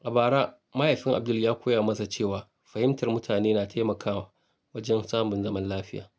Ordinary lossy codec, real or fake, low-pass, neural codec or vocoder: none; real; none; none